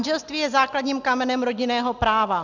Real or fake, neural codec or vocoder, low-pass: real; none; 7.2 kHz